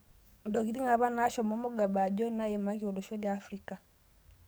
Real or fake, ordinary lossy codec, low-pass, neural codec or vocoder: fake; none; none; codec, 44.1 kHz, 7.8 kbps, DAC